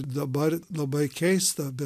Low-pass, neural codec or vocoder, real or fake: 14.4 kHz; none; real